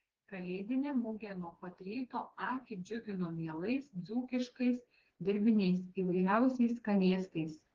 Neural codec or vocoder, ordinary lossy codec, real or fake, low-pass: codec, 16 kHz, 2 kbps, FreqCodec, smaller model; Opus, 16 kbps; fake; 7.2 kHz